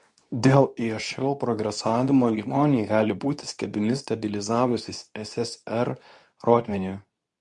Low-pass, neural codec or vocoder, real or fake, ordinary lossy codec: 10.8 kHz; codec, 24 kHz, 0.9 kbps, WavTokenizer, medium speech release version 2; fake; MP3, 64 kbps